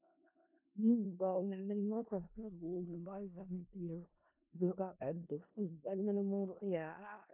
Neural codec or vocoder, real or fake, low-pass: codec, 16 kHz in and 24 kHz out, 0.4 kbps, LongCat-Audio-Codec, four codebook decoder; fake; 3.6 kHz